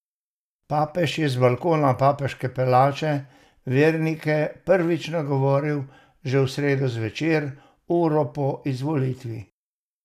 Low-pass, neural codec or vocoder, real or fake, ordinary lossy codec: 14.4 kHz; none; real; none